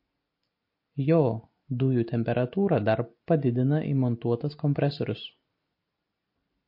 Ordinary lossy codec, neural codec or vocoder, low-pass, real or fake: MP3, 32 kbps; none; 5.4 kHz; real